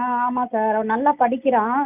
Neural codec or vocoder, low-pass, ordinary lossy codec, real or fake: none; 3.6 kHz; none; real